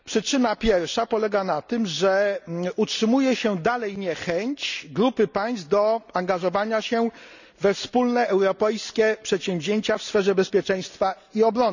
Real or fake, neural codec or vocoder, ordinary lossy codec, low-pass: real; none; none; 7.2 kHz